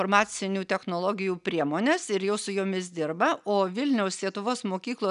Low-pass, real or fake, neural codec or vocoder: 10.8 kHz; real; none